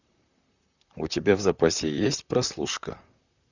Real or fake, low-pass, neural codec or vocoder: fake; 7.2 kHz; vocoder, 44.1 kHz, 128 mel bands, Pupu-Vocoder